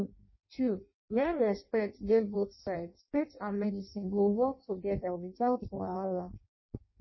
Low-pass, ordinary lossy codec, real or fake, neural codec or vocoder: 7.2 kHz; MP3, 24 kbps; fake; codec, 16 kHz in and 24 kHz out, 0.6 kbps, FireRedTTS-2 codec